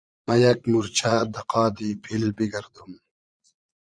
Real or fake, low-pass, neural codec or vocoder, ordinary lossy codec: fake; 9.9 kHz; vocoder, 22.05 kHz, 80 mel bands, Vocos; Opus, 64 kbps